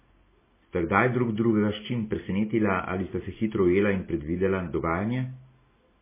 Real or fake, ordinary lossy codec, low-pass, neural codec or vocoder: real; MP3, 16 kbps; 3.6 kHz; none